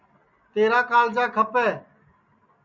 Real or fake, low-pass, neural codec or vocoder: real; 7.2 kHz; none